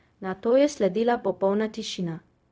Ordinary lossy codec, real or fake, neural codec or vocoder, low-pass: none; fake; codec, 16 kHz, 0.4 kbps, LongCat-Audio-Codec; none